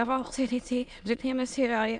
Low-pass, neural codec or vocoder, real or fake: 9.9 kHz; autoencoder, 22.05 kHz, a latent of 192 numbers a frame, VITS, trained on many speakers; fake